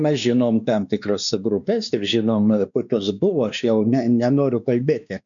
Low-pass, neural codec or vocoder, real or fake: 7.2 kHz; codec, 16 kHz, 2 kbps, X-Codec, WavLM features, trained on Multilingual LibriSpeech; fake